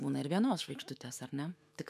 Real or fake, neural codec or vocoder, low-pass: fake; autoencoder, 48 kHz, 128 numbers a frame, DAC-VAE, trained on Japanese speech; 14.4 kHz